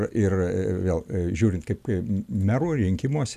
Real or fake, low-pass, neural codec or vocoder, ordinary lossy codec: real; 14.4 kHz; none; AAC, 96 kbps